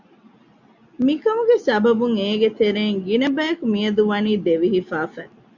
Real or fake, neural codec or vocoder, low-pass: real; none; 7.2 kHz